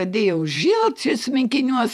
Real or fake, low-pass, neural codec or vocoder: fake; 14.4 kHz; vocoder, 48 kHz, 128 mel bands, Vocos